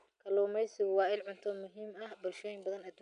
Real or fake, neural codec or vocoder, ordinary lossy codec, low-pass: real; none; none; 9.9 kHz